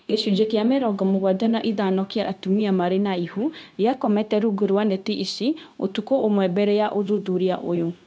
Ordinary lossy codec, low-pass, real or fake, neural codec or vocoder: none; none; fake; codec, 16 kHz, 0.9 kbps, LongCat-Audio-Codec